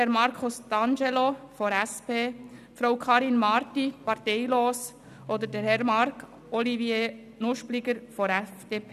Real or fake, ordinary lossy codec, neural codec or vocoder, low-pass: real; none; none; 14.4 kHz